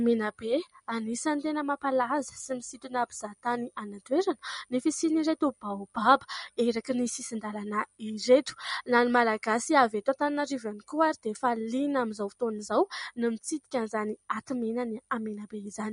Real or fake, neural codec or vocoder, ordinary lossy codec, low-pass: real; none; MP3, 48 kbps; 10.8 kHz